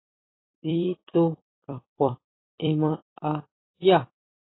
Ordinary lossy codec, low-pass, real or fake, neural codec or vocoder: AAC, 16 kbps; 7.2 kHz; fake; vocoder, 44.1 kHz, 128 mel bands every 256 samples, BigVGAN v2